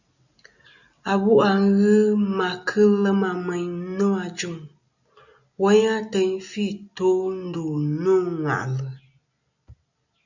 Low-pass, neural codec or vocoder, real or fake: 7.2 kHz; none; real